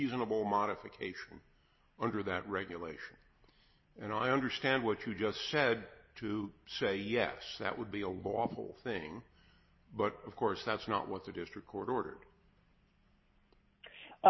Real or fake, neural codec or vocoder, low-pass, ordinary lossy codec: real; none; 7.2 kHz; MP3, 24 kbps